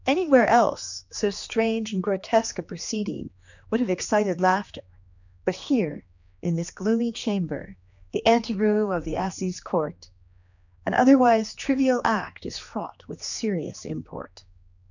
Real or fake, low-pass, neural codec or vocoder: fake; 7.2 kHz; codec, 16 kHz, 2 kbps, X-Codec, HuBERT features, trained on general audio